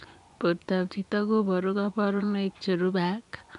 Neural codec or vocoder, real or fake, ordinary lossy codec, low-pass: vocoder, 24 kHz, 100 mel bands, Vocos; fake; none; 10.8 kHz